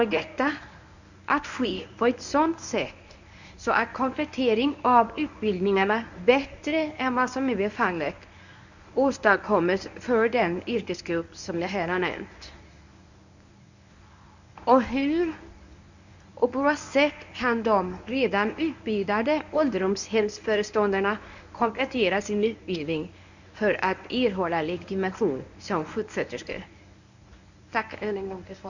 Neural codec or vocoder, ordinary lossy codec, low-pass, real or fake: codec, 24 kHz, 0.9 kbps, WavTokenizer, medium speech release version 1; none; 7.2 kHz; fake